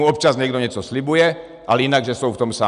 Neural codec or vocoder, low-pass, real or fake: none; 10.8 kHz; real